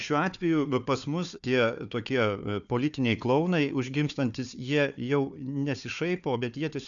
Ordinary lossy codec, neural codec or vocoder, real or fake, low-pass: MP3, 96 kbps; codec, 16 kHz, 4 kbps, FunCodec, trained on Chinese and English, 50 frames a second; fake; 7.2 kHz